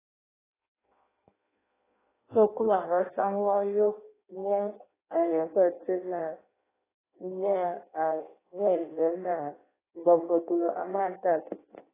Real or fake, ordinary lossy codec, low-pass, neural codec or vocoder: fake; AAC, 16 kbps; 3.6 kHz; codec, 16 kHz in and 24 kHz out, 0.6 kbps, FireRedTTS-2 codec